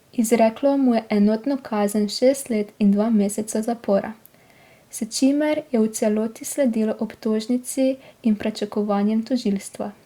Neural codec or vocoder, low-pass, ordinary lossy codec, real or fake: none; 19.8 kHz; Opus, 64 kbps; real